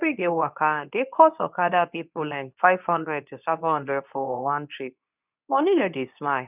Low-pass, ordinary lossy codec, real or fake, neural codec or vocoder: 3.6 kHz; none; fake; codec, 24 kHz, 0.9 kbps, WavTokenizer, medium speech release version 2